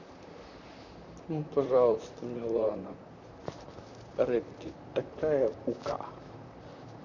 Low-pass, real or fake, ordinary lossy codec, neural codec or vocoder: 7.2 kHz; fake; none; vocoder, 44.1 kHz, 128 mel bands, Pupu-Vocoder